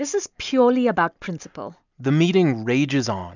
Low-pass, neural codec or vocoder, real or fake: 7.2 kHz; none; real